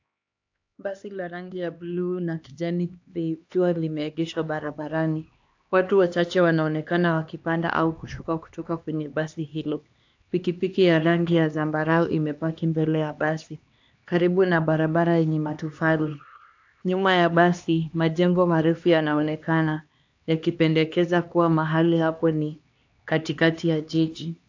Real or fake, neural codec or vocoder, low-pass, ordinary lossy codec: fake; codec, 16 kHz, 2 kbps, X-Codec, HuBERT features, trained on LibriSpeech; 7.2 kHz; AAC, 48 kbps